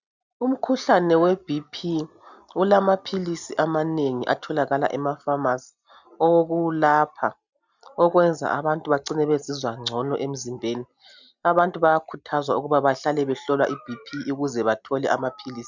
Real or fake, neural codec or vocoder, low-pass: real; none; 7.2 kHz